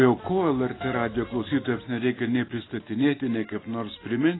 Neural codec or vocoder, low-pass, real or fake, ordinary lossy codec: none; 7.2 kHz; real; AAC, 16 kbps